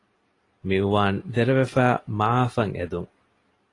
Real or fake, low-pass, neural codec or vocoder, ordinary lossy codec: real; 10.8 kHz; none; AAC, 32 kbps